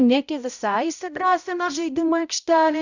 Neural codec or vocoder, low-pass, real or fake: codec, 16 kHz, 0.5 kbps, X-Codec, HuBERT features, trained on balanced general audio; 7.2 kHz; fake